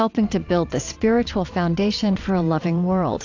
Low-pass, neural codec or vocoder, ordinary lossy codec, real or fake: 7.2 kHz; vocoder, 22.05 kHz, 80 mel bands, WaveNeXt; AAC, 48 kbps; fake